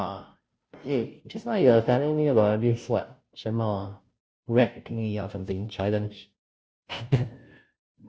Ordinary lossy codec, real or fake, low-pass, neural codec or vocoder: none; fake; none; codec, 16 kHz, 0.5 kbps, FunCodec, trained on Chinese and English, 25 frames a second